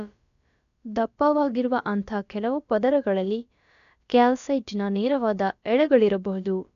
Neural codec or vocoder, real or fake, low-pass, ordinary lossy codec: codec, 16 kHz, about 1 kbps, DyCAST, with the encoder's durations; fake; 7.2 kHz; none